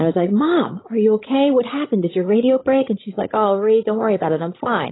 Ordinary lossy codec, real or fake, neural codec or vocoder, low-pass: AAC, 16 kbps; fake; codec, 16 kHz, 16 kbps, FreqCodec, larger model; 7.2 kHz